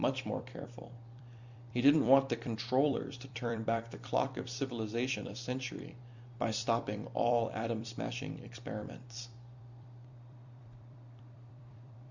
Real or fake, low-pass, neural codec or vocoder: fake; 7.2 kHz; vocoder, 44.1 kHz, 128 mel bands every 256 samples, BigVGAN v2